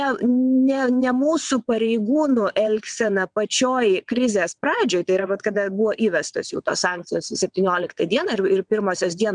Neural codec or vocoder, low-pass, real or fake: vocoder, 22.05 kHz, 80 mel bands, WaveNeXt; 9.9 kHz; fake